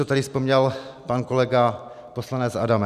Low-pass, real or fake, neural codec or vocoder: 14.4 kHz; real; none